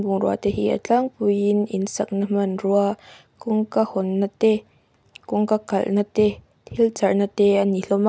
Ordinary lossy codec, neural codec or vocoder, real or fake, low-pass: none; none; real; none